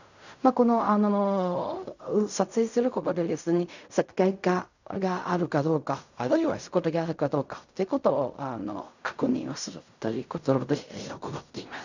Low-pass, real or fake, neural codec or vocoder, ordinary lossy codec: 7.2 kHz; fake; codec, 16 kHz in and 24 kHz out, 0.4 kbps, LongCat-Audio-Codec, fine tuned four codebook decoder; none